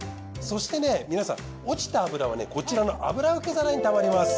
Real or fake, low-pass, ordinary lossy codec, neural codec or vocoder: real; none; none; none